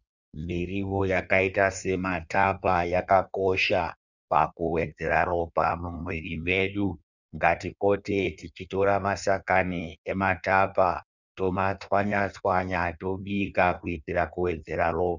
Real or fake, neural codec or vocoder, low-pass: fake; codec, 16 kHz in and 24 kHz out, 1.1 kbps, FireRedTTS-2 codec; 7.2 kHz